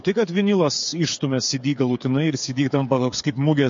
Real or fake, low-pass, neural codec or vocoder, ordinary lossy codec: fake; 7.2 kHz; codec, 16 kHz, 8 kbps, FreqCodec, smaller model; MP3, 48 kbps